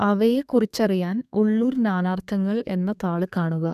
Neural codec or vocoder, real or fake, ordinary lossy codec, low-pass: codec, 32 kHz, 1.9 kbps, SNAC; fake; none; 14.4 kHz